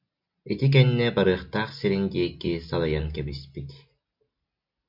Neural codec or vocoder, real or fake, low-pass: none; real; 5.4 kHz